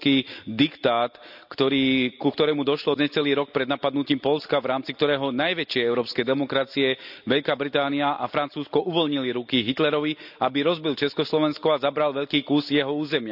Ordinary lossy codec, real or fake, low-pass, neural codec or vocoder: none; real; 5.4 kHz; none